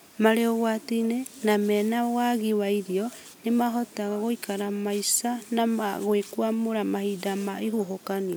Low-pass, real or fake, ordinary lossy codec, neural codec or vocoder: none; real; none; none